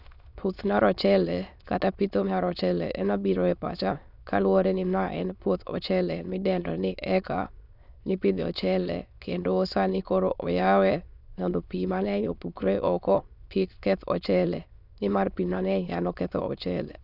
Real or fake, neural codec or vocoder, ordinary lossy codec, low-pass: fake; autoencoder, 22.05 kHz, a latent of 192 numbers a frame, VITS, trained on many speakers; none; 5.4 kHz